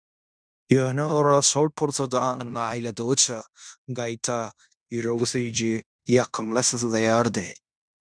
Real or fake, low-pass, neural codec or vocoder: fake; 9.9 kHz; codec, 16 kHz in and 24 kHz out, 0.9 kbps, LongCat-Audio-Codec, fine tuned four codebook decoder